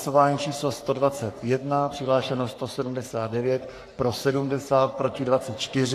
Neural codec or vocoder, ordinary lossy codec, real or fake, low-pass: codec, 44.1 kHz, 3.4 kbps, Pupu-Codec; AAC, 64 kbps; fake; 14.4 kHz